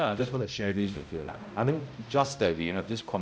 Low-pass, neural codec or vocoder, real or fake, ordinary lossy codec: none; codec, 16 kHz, 0.5 kbps, X-Codec, HuBERT features, trained on balanced general audio; fake; none